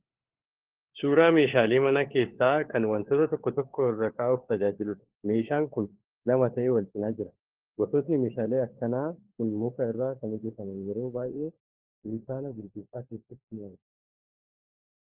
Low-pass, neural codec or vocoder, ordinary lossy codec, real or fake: 3.6 kHz; codec, 16 kHz, 4 kbps, FunCodec, trained on LibriTTS, 50 frames a second; Opus, 16 kbps; fake